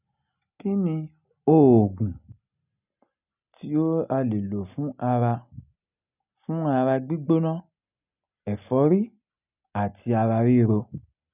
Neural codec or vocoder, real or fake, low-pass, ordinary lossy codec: none; real; 3.6 kHz; none